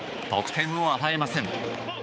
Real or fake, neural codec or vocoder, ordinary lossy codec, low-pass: fake; codec, 16 kHz, 4 kbps, X-Codec, HuBERT features, trained on balanced general audio; none; none